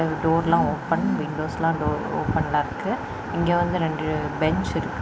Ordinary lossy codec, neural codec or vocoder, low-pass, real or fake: none; none; none; real